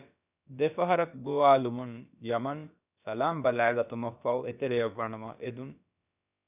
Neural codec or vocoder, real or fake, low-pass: codec, 16 kHz, about 1 kbps, DyCAST, with the encoder's durations; fake; 3.6 kHz